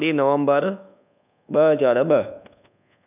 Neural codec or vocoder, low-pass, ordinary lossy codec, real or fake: codec, 24 kHz, 1.2 kbps, DualCodec; 3.6 kHz; none; fake